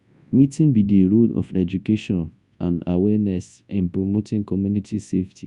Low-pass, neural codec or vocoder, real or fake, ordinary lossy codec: 10.8 kHz; codec, 24 kHz, 0.9 kbps, WavTokenizer, large speech release; fake; Opus, 64 kbps